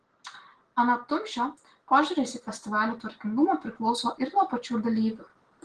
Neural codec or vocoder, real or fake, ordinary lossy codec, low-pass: none; real; Opus, 16 kbps; 9.9 kHz